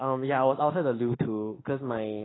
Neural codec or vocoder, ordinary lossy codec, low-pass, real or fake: autoencoder, 48 kHz, 32 numbers a frame, DAC-VAE, trained on Japanese speech; AAC, 16 kbps; 7.2 kHz; fake